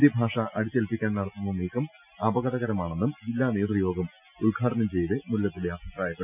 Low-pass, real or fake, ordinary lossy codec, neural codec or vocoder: 3.6 kHz; real; none; none